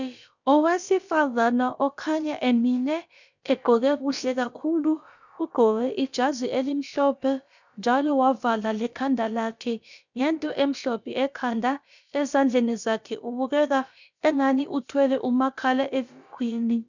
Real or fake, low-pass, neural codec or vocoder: fake; 7.2 kHz; codec, 16 kHz, about 1 kbps, DyCAST, with the encoder's durations